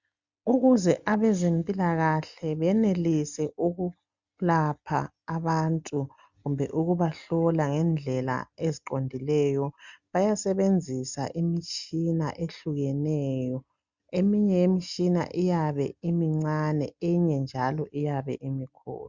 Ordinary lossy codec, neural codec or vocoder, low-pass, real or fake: Opus, 64 kbps; none; 7.2 kHz; real